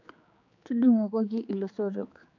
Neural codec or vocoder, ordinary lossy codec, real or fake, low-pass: codec, 16 kHz, 4 kbps, X-Codec, HuBERT features, trained on general audio; AAC, 48 kbps; fake; 7.2 kHz